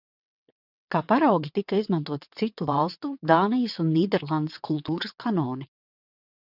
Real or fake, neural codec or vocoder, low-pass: fake; vocoder, 22.05 kHz, 80 mel bands, WaveNeXt; 5.4 kHz